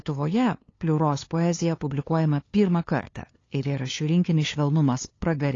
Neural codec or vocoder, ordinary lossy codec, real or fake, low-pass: codec, 16 kHz, 2 kbps, FunCodec, trained on LibriTTS, 25 frames a second; AAC, 32 kbps; fake; 7.2 kHz